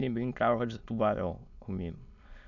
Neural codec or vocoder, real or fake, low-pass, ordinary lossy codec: autoencoder, 22.05 kHz, a latent of 192 numbers a frame, VITS, trained on many speakers; fake; 7.2 kHz; none